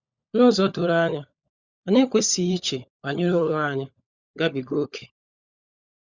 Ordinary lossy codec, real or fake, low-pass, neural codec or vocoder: Opus, 64 kbps; fake; 7.2 kHz; codec, 16 kHz, 16 kbps, FunCodec, trained on LibriTTS, 50 frames a second